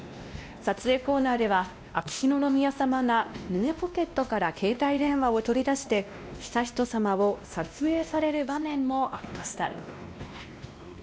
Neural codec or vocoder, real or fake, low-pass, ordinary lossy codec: codec, 16 kHz, 1 kbps, X-Codec, WavLM features, trained on Multilingual LibriSpeech; fake; none; none